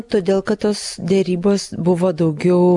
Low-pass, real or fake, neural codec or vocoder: 10.8 kHz; real; none